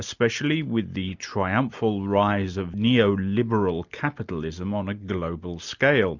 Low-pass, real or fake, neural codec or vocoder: 7.2 kHz; real; none